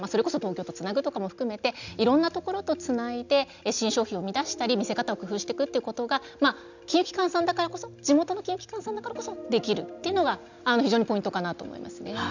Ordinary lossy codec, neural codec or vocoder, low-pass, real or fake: none; none; 7.2 kHz; real